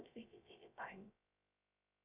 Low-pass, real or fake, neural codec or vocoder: 3.6 kHz; fake; codec, 16 kHz, 0.3 kbps, FocalCodec